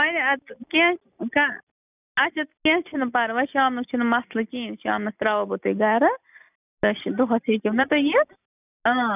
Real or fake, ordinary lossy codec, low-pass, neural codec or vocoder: real; none; 3.6 kHz; none